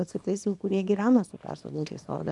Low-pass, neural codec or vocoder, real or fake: 10.8 kHz; codec, 24 kHz, 0.9 kbps, WavTokenizer, small release; fake